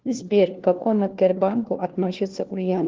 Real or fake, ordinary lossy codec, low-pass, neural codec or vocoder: fake; Opus, 16 kbps; 7.2 kHz; codec, 16 kHz, 1 kbps, FunCodec, trained on LibriTTS, 50 frames a second